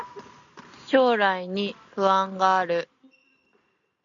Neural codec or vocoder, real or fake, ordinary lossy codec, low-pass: none; real; AAC, 64 kbps; 7.2 kHz